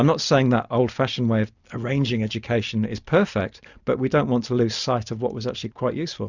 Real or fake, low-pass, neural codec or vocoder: real; 7.2 kHz; none